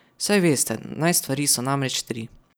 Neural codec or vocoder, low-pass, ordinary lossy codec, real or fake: none; none; none; real